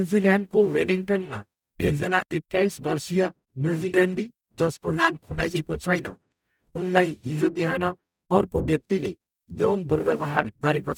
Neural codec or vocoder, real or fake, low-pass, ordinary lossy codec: codec, 44.1 kHz, 0.9 kbps, DAC; fake; 19.8 kHz; none